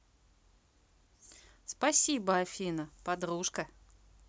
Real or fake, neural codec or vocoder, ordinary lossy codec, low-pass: real; none; none; none